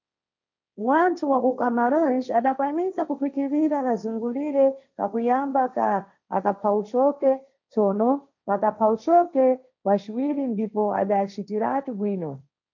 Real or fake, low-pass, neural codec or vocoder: fake; 7.2 kHz; codec, 16 kHz, 1.1 kbps, Voila-Tokenizer